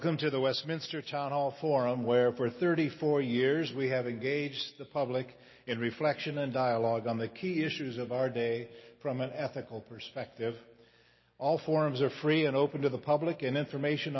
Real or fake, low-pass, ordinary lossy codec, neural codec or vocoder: real; 7.2 kHz; MP3, 24 kbps; none